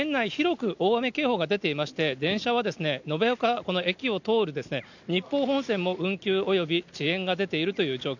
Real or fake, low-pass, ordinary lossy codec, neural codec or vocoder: real; 7.2 kHz; none; none